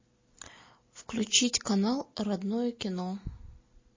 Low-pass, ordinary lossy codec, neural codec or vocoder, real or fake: 7.2 kHz; MP3, 32 kbps; none; real